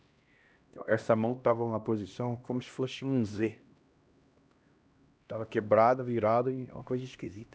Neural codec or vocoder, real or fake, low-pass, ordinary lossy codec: codec, 16 kHz, 1 kbps, X-Codec, HuBERT features, trained on LibriSpeech; fake; none; none